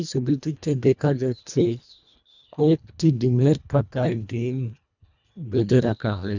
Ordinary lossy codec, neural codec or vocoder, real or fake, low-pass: none; codec, 24 kHz, 1.5 kbps, HILCodec; fake; 7.2 kHz